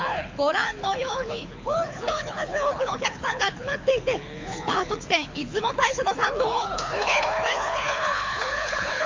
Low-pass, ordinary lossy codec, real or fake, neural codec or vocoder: 7.2 kHz; MP3, 48 kbps; fake; codec, 24 kHz, 6 kbps, HILCodec